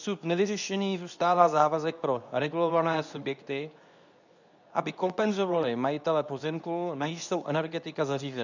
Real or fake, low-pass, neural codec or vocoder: fake; 7.2 kHz; codec, 24 kHz, 0.9 kbps, WavTokenizer, medium speech release version 2